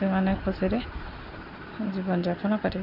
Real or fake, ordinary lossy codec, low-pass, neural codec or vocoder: real; none; 5.4 kHz; none